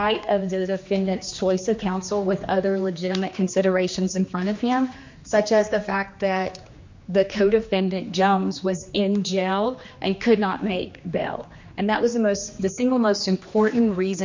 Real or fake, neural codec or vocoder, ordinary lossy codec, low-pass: fake; codec, 16 kHz, 2 kbps, X-Codec, HuBERT features, trained on general audio; MP3, 48 kbps; 7.2 kHz